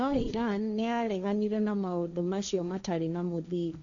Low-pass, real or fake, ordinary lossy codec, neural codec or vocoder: 7.2 kHz; fake; none; codec, 16 kHz, 1.1 kbps, Voila-Tokenizer